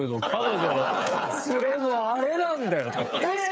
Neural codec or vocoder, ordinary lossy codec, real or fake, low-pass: codec, 16 kHz, 8 kbps, FreqCodec, smaller model; none; fake; none